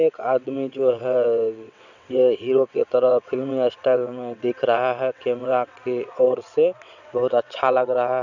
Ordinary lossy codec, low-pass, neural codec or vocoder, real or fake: none; 7.2 kHz; vocoder, 22.05 kHz, 80 mel bands, WaveNeXt; fake